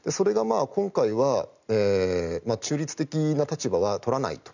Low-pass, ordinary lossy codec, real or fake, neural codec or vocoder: 7.2 kHz; none; real; none